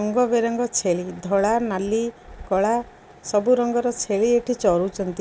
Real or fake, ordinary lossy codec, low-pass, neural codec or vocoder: real; none; none; none